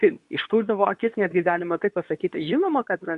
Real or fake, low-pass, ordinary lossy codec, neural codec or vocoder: fake; 10.8 kHz; MP3, 64 kbps; codec, 24 kHz, 0.9 kbps, WavTokenizer, medium speech release version 2